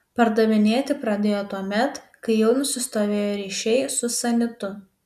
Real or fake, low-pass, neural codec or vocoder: real; 14.4 kHz; none